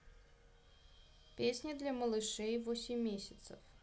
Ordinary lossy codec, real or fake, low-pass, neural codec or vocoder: none; real; none; none